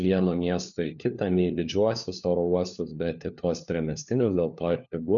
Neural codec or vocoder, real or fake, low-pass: codec, 16 kHz, 4 kbps, FunCodec, trained on LibriTTS, 50 frames a second; fake; 7.2 kHz